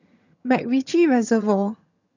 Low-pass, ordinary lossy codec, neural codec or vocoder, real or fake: 7.2 kHz; none; vocoder, 22.05 kHz, 80 mel bands, HiFi-GAN; fake